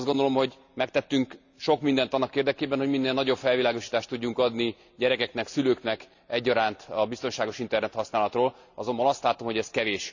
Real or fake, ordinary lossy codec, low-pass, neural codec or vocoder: real; none; 7.2 kHz; none